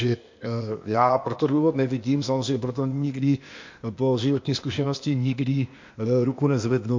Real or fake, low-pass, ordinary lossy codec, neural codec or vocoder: fake; 7.2 kHz; MP3, 48 kbps; codec, 16 kHz, 0.8 kbps, ZipCodec